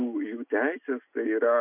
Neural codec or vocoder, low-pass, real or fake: none; 3.6 kHz; real